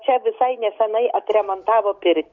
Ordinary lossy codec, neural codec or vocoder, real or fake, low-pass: MP3, 48 kbps; none; real; 7.2 kHz